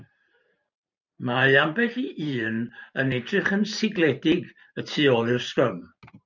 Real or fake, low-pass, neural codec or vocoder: fake; 7.2 kHz; vocoder, 44.1 kHz, 128 mel bands every 512 samples, BigVGAN v2